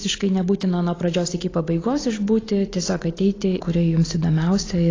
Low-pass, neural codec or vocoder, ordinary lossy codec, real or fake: 7.2 kHz; none; AAC, 32 kbps; real